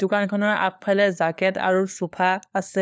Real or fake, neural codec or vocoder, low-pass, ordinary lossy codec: fake; codec, 16 kHz, 4 kbps, FunCodec, trained on LibriTTS, 50 frames a second; none; none